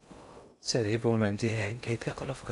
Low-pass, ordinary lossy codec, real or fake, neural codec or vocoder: 10.8 kHz; none; fake; codec, 16 kHz in and 24 kHz out, 0.6 kbps, FocalCodec, streaming, 2048 codes